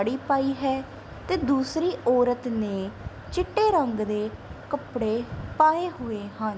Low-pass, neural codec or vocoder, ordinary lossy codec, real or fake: none; none; none; real